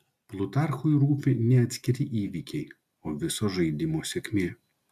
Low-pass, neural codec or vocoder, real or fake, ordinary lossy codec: 14.4 kHz; none; real; MP3, 96 kbps